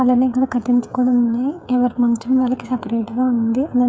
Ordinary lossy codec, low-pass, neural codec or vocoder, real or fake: none; none; codec, 16 kHz, 8 kbps, FreqCodec, smaller model; fake